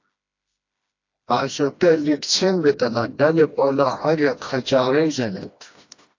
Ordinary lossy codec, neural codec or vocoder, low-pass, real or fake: AAC, 48 kbps; codec, 16 kHz, 1 kbps, FreqCodec, smaller model; 7.2 kHz; fake